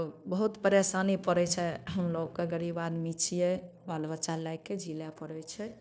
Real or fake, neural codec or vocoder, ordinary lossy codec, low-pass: fake; codec, 16 kHz, 0.9 kbps, LongCat-Audio-Codec; none; none